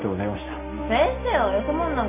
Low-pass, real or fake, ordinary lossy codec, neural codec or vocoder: 3.6 kHz; real; MP3, 24 kbps; none